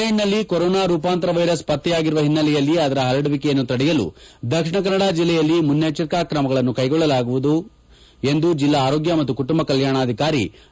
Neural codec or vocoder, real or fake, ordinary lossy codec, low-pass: none; real; none; none